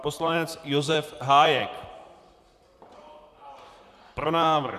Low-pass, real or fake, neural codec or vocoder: 14.4 kHz; fake; vocoder, 44.1 kHz, 128 mel bands, Pupu-Vocoder